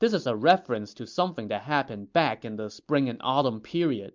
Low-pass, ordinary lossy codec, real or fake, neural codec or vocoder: 7.2 kHz; MP3, 64 kbps; real; none